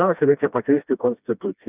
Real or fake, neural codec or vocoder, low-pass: fake; codec, 16 kHz, 1 kbps, FreqCodec, smaller model; 3.6 kHz